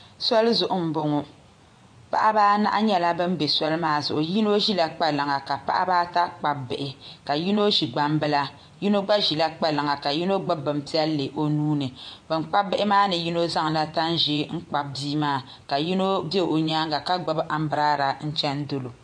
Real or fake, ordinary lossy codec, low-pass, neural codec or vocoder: fake; MP3, 48 kbps; 9.9 kHz; vocoder, 24 kHz, 100 mel bands, Vocos